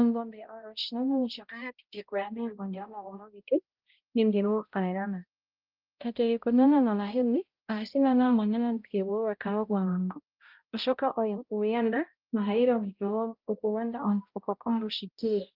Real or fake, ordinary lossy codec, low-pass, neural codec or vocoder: fake; Opus, 24 kbps; 5.4 kHz; codec, 16 kHz, 0.5 kbps, X-Codec, HuBERT features, trained on balanced general audio